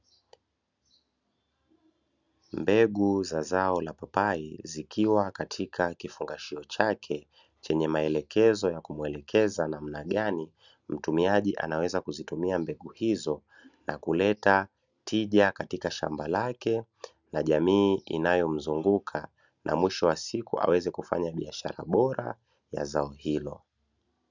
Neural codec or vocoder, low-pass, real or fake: none; 7.2 kHz; real